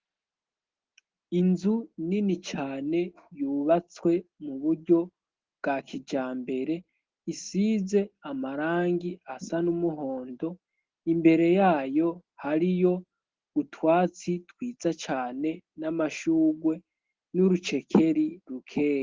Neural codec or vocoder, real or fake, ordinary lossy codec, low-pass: none; real; Opus, 16 kbps; 7.2 kHz